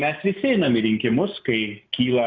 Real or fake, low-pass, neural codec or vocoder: real; 7.2 kHz; none